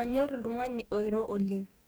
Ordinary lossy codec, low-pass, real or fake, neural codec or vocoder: none; none; fake; codec, 44.1 kHz, 2.6 kbps, DAC